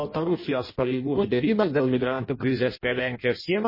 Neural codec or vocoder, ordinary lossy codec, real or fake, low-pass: codec, 16 kHz in and 24 kHz out, 0.6 kbps, FireRedTTS-2 codec; MP3, 24 kbps; fake; 5.4 kHz